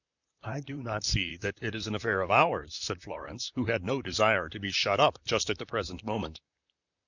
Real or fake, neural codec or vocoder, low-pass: fake; vocoder, 44.1 kHz, 128 mel bands, Pupu-Vocoder; 7.2 kHz